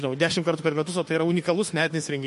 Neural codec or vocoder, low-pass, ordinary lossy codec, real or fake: autoencoder, 48 kHz, 32 numbers a frame, DAC-VAE, trained on Japanese speech; 14.4 kHz; MP3, 48 kbps; fake